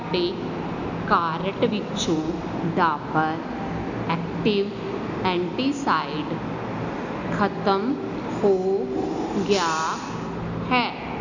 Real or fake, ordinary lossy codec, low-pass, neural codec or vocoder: real; none; 7.2 kHz; none